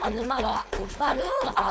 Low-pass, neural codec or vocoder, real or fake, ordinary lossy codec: none; codec, 16 kHz, 4.8 kbps, FACodec; fake; none